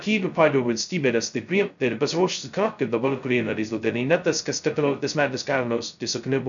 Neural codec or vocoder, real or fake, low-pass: codec, 16 kHz, 0.2 kbps, FocalCodec; fake; 7.2 kHz